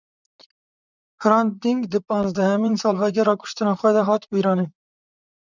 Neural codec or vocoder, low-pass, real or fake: vocoder, 44.1 kHz, 128 mel bands, Pupu-Vocoder; 7.2 kHz; fake